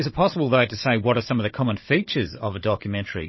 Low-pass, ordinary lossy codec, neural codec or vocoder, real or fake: 7.2 kHz; MP3, 24 kbps; codec, 16 kHz, 16 kbps, FunCodec, trained on Chinese and English, 50 frames a second; fake